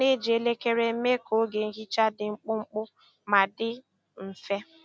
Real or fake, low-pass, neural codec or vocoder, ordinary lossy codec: real; none; none; none